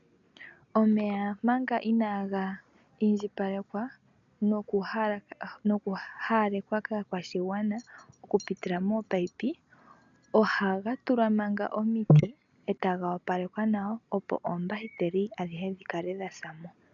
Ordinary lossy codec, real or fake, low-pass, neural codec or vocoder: MP3, 96 kbps; real; 7.2 kHz; none